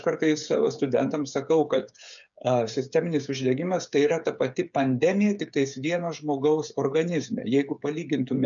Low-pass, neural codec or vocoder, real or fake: 7.2 kHz; codec, 16 kHz, 8 kbps, FreqCodec, smaller model; fake